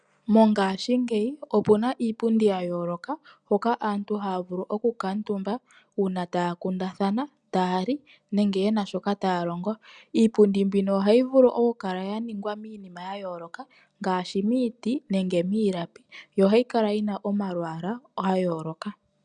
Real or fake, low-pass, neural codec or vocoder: real; 9.9 kHz; none